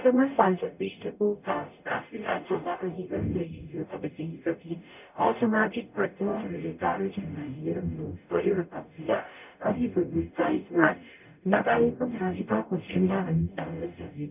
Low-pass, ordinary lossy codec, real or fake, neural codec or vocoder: 3.6 kHz; none; fake; codec, 44.1 kHz, 0.9 kbps, DAC